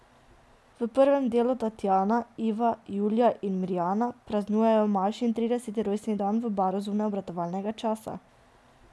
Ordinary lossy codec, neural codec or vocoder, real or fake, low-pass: none; none; real; none